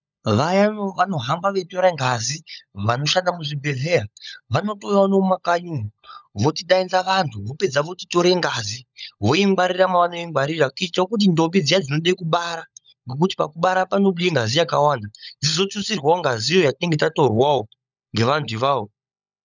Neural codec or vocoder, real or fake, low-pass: codec, 16 kHz, 16 kbps, FunCodec, trained on LibriTTS, 50 frames a second; fake; 7.2 kHz